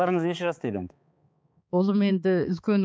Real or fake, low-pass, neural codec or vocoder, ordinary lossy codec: fake; none; codec, 16 kHz, 4 kbps, X-Codec, HuBERT features, trained on balanced general audio; none